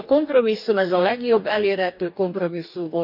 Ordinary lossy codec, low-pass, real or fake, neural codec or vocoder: none; 5.4 kHz; fake; codec, 44.1 kHz, 2.6 kbps, DAC